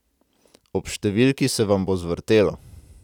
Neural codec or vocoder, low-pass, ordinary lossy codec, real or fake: none; 19.8 kHz; none; real